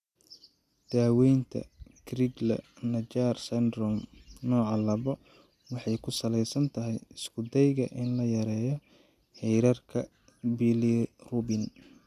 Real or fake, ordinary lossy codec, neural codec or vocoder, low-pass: real; none; none; 14.4 kHz